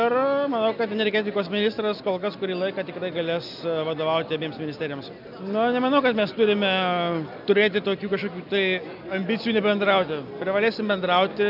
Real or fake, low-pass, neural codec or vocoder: real; 5.4 kHz; none